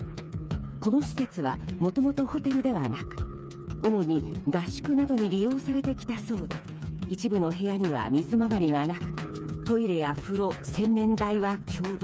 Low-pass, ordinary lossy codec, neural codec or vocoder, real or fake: none; none; codec, 16 kHz, 4 kbps, FreqCodec, smaller model; fake